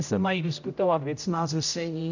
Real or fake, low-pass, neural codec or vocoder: fake; 7.2 kHz; codec, 16 kHz, 0.5 kbps, X-Codec, HuBERT features, trained on general audio